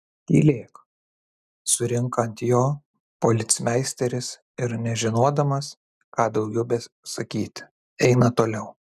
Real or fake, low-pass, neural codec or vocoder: real; 14.4 kHz; none